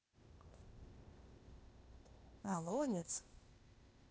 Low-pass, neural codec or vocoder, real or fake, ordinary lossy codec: none; codec, 16 kHz, 0.8 kbps, ZipCodec; fake; none